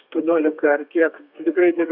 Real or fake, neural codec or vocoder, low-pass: fake; codec, 32 kHz, 1.9 kbps, SNAC; 5.4 kHz